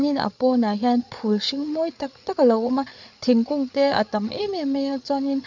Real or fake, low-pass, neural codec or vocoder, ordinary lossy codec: fake; 7.2 kHz; codec, 16 kHz, 8 kbps, FreqCodec, larger model; none